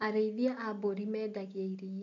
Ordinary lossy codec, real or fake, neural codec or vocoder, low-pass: none; real; none; 7.2 kHz